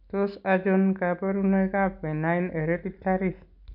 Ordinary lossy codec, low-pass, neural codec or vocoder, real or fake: none; 5.4 kHz; none; real